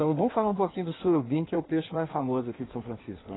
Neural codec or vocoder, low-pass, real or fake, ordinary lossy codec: codec, 16 kHz in and 24 kHz out, 1.1 kbps, FireRedTTS-2 codec; 7.2 kHz; fake; AAC, 16 kbps